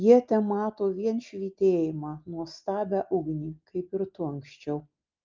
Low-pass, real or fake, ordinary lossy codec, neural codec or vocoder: 7.2 kHz; real; Opus, 32 kbps; none